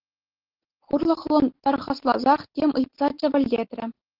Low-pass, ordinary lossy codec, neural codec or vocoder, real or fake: 5.4 kHz; Opus, 16 kbps; none; real